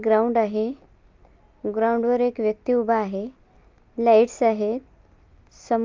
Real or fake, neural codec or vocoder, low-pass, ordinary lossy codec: real; none; 7.2 kHz; Opus, 24 kbps